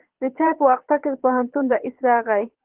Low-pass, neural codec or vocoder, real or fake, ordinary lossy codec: 3.6 kHz; vocoder, 22.05 kHz, 80 mel bands, Vocos; fake; Opus, 16 kbps